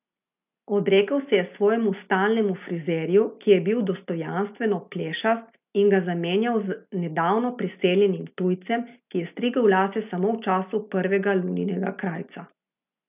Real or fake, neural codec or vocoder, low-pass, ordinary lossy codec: real; none; 3.6 kHz; none